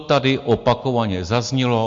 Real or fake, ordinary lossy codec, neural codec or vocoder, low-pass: real; MP3, 48 kbps; none; 7.2 kHz